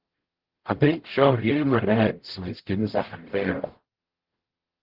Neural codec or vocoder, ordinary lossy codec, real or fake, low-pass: codec, 44.1 kHz, 0.9 kbps, DAC; Opus, 16 kbps; fake; 5.4 kHz